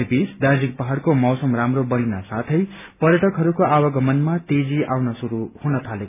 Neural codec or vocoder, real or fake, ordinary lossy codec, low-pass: none; real; none; 3.6 kHz